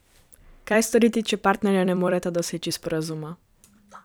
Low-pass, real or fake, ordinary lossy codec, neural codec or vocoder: none; fake; none; vocoder, 44.1 kHz, 128 mel bands every 256 samples, BigVGAN v2